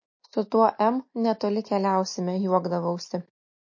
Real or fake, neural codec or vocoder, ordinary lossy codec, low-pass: real; none; MP3, 32 kbps; 7.2 kHz